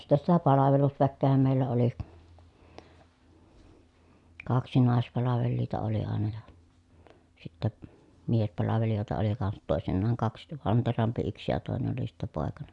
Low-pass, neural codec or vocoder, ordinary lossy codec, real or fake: 10.8 kHz; none; none; real